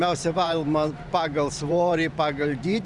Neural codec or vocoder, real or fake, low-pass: vocoder, 44.1 kHz, 128 mel bands every 512 samples, BigVGAN v2; fake; 10.8 kHz